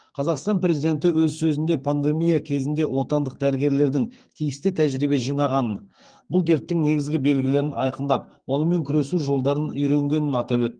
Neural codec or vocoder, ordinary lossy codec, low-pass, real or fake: codec, 32 kHz, 1.9 kbps, SNAC; Opus, 24 kbps; 9.9 kHz; fake